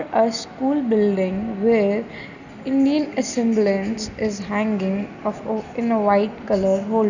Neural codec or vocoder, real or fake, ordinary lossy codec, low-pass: none; real; none; 7.2 kHz